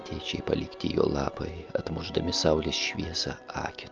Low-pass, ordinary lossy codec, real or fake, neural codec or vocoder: 7.2 kHz; Opus, 24 kbps; real; none